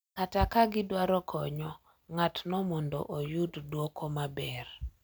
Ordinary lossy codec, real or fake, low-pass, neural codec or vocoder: none; real; none; none